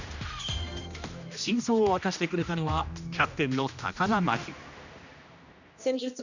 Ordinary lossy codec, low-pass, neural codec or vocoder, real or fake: none; 7.2 kHz; codec, 16 kHz, 1 kbps, X-Codec, HuBERT features, trained on general audio; fake